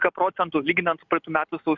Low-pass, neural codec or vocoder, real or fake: 7.2 kHz; none; real